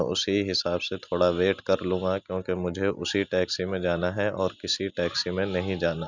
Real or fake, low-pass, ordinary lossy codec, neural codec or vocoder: real; 7.2 kHz; none; none